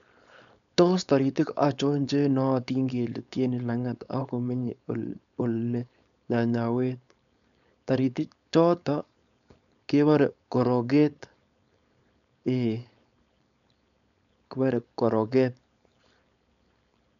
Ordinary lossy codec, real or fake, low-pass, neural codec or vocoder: none; fake; 7.2 kHz; codec, 16 kHz, 4.8 kbps, FACodec